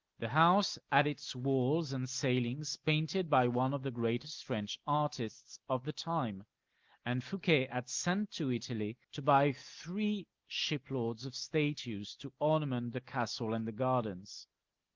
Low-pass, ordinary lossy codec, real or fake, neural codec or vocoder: 7.2 kHz; Opus, 32 kbps; real; none